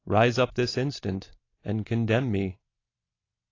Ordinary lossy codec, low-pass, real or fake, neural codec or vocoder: AAC, 32 kbps; 7.2 kHz; real; none